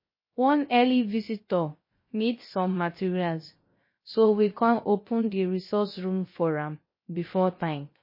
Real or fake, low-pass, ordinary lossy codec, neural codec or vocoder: fake; 5.4 kHz; MP3, 24 kbps; codec, 16 kHz, 0.3 kbps, FocalCodec